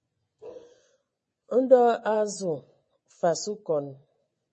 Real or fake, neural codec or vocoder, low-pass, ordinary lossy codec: fake; vocoder, 24 kHz, 100 mel bands, Vocos; 10.8 kHz; MP3, 32 kbps